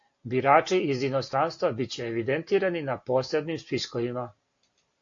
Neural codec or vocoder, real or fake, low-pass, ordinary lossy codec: none; real; 7.2 kHz; AAC, 48 kbps